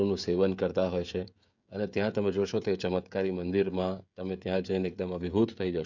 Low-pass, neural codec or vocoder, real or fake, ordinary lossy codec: 7.2 kHz; codec, 16 kHz, 16 kbps, FreqCodec, smaller model; fake; none